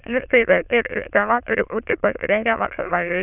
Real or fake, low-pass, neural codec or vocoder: fake; 3.6 kHz; autoencoder, 22.05 kHz, a latent of 192 numbers a frame, VITS, trained on many speakers